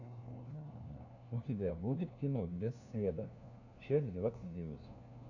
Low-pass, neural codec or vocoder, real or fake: 7.2 kHz; codec, 16 kHz, 1 kbps, FunCodec, trained on LibriTTS, 50 frames a second; fake